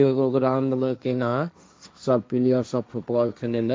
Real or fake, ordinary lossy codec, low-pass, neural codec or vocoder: fake; none; none; codec, 16 kHz, 1.1 kbps, Voila-Tokenizer